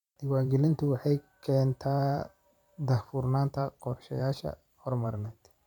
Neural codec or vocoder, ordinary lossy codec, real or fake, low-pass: vocoder, 44.1 kHz, 128 mel bands every 512 samples, BigVGAN v2; none; fake; 19.8 kHz